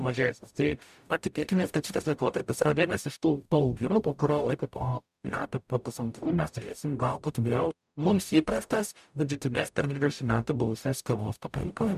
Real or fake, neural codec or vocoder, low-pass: fake; codec, 44.1 kHz, 0.9 kbps, DAC; 14.4 kHz